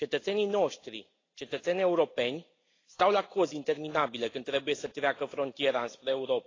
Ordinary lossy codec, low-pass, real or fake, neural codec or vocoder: AAC, 32 kbps; 7.2 kHz; real; none